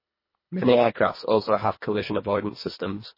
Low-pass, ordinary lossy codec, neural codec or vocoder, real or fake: 5.4 kHz; MP3, 24 kbps; codec, 24 kHz, 1.5 kbps, HILCodec; fake